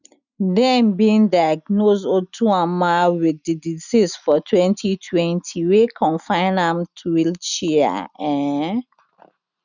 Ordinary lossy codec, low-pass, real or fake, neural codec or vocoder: none; 7.2 kHz; real; none